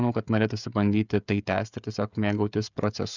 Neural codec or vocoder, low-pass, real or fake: codec, 16 kHz, 16 kbps, FreqCodec, smaller model; 7.2 kHz; fake